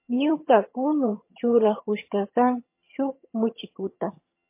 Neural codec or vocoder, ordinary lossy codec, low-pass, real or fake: vocoder, 22.05 kHz, 80 mel bands, HiFi-GAN; MP3, 24 kbps; 3.6 kHz; fake